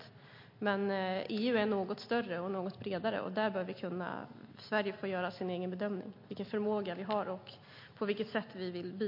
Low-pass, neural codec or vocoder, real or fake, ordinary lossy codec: 5.4 kHz; none; real; MP3, 32 kbps